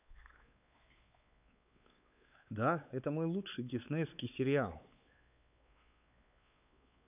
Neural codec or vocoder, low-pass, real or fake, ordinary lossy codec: codec, 16 kHz, 4 kbps, X-Codec, WavLM features, trained on Multilingual LibriSpeech; 3.6 kHz; fake; none